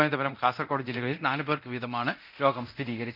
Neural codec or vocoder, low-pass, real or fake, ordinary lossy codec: codec, 24 kHz, 0.9 kbps, DualCodec; 5.4 kHz; fake; none